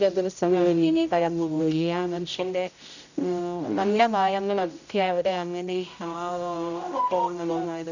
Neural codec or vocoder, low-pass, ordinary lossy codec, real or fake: codec, 16 kHz, 0.5 kbps, X-Codec, HuBERT features, trained on general audio; 7.2 kHz; none; fake